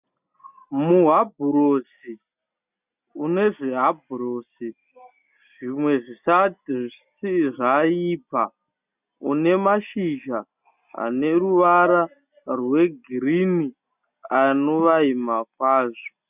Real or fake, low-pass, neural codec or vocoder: real; 3.6 kHz; none